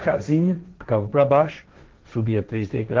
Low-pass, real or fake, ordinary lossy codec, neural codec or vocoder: 7.2 kHz; fake; Opus, 16 kbps; codec, 16 kHz, 1.1 kbps, Voila-Tokenizer